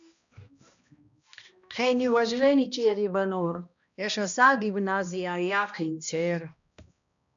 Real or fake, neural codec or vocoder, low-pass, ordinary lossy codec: fake; codec, 16 kHz, 1 kbps, X-Codec, HuBERT features, trained on balanced general audio; 7.2 kHz; MP3, 96 kbps